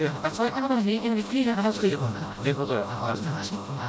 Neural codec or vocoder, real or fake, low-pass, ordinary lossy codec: codec, 16 kHz, 0.5 kbps, FreqCodec, smaller model; fake; none; none